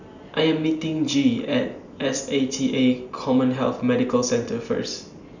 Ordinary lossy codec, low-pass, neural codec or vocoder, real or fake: none; 7.2 kHz; none; real